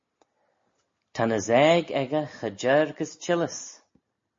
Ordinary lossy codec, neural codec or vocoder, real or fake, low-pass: MP3, 32 kbps; none; real; 7.2 kHz